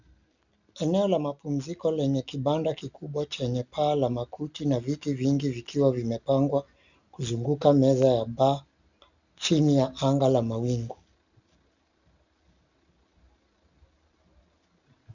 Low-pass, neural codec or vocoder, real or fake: 7.2 kHz; none; real